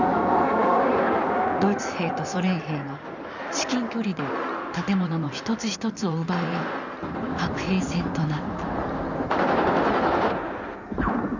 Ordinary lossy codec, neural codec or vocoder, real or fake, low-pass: none; codec, 16 kHz in and 24 kHz out, 2.2 kbps, FireRedTTS-2 codec; fake; 7.2 kHz